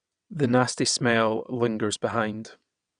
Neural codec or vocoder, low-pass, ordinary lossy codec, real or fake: vocoder, 22.05 kHz, 80 mel bands, WaveNeXt; 9.9 kHz; none; fake